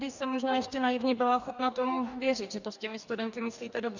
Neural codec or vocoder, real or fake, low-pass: codec, 44.1 kHz, 2.6 kbps, DAC; fake; 7.2 kHz